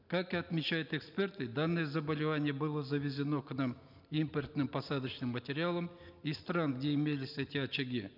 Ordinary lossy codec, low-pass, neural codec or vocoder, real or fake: none; 5.4 kHz; none; real